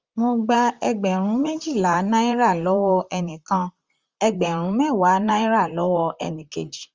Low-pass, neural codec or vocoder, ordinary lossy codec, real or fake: 7.2 kHz; vocoder, 44.1 kHz, 128 mel bands, Pupu-Vocoder; Opus, 32 kbps; fake